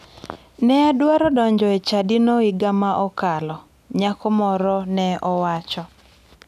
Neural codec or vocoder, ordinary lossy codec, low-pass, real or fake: none; none; 14.4 kHz; real